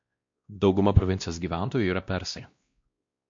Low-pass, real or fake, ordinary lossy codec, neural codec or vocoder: 7.2 kHz; fake; MP3, 48 kbps; codec, 16 kHz, 1 kbps, X-Codec, WavLM features, trained on Multilingual LibriSpeech